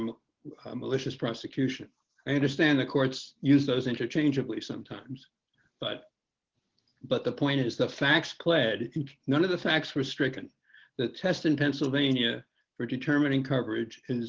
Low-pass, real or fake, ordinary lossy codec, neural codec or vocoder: 7.2 kHz; real; Opus, 16 kbps; none